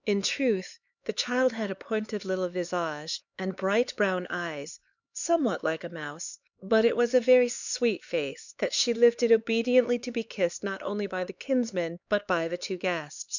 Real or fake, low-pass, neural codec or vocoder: fake; 7.2 kHz; codec, 16 kHz, 4 kbps, X-Codec, HuBERT features, trained on LibriSpeech